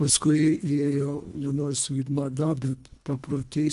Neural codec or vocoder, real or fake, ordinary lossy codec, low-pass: codec, 24 kHz, 1.5 kbps, HILCodec; fake; AAC, 96 kbps; 10.8 kHz